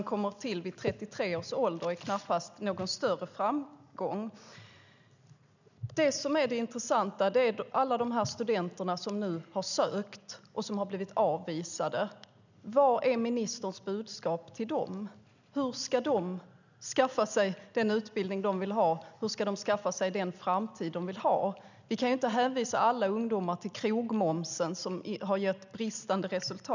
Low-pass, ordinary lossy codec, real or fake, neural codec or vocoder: 7.2 kHz; none; real; none